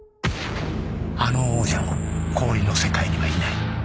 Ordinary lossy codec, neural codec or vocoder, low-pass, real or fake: none; none; none; real